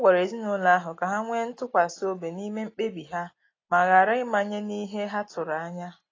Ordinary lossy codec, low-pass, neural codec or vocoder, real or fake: AAC, 32 kbps; 7.2 kHz; none; real